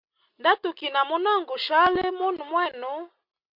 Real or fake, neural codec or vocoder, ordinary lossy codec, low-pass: real; none; MP3, 48 kbps; 5.4 kHz